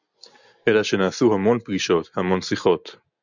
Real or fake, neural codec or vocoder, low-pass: real; none; 7.2 kHz